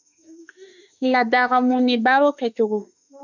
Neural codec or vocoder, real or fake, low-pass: autoencoder, 48 kHz, 32 numbers a frame, DAC-VAE, trained on Japanese speech; fake; 7.2 kHz